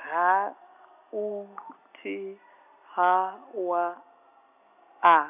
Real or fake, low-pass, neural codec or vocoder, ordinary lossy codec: real; 3.6 kHz; none; none